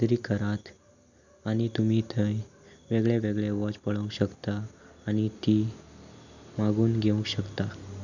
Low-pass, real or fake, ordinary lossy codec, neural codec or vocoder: 7.2 kHz; real; none; none